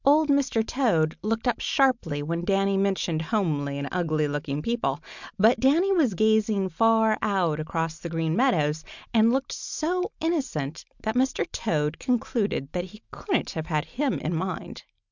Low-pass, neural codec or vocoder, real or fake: 7.2 kHz; none; real